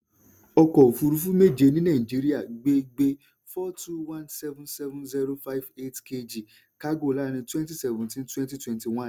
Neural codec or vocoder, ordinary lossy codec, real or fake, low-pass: none; none; real; none